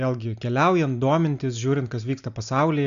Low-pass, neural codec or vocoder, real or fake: 7.2 kHz; none; real